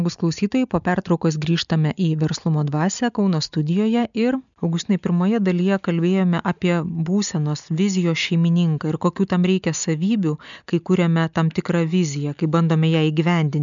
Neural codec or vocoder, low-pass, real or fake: none; 7.2 kHz; real